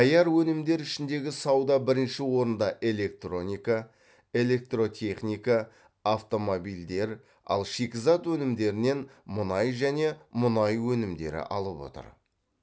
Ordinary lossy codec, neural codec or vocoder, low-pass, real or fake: none; none; none; real